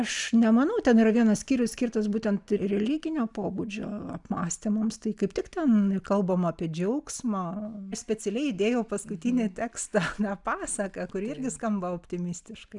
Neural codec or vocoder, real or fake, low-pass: none; real; 10.8 kHz